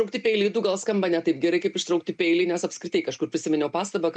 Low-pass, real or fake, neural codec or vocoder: 9.9 kHz; real; none